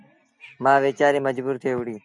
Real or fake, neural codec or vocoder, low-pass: real; none; 10.8 kHz